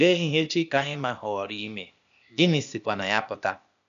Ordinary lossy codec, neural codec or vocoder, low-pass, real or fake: none; codec, 16 kHz, 0.8 kbps, ZipCodec; 7.2 kHz; fake